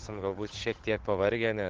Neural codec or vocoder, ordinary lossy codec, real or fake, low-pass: codec, 16 kHz, 8 kbps, FunCodec, trained on Chinese and English, 25 frames a second; Opus, 16 kbps; fake; 7.2 kHz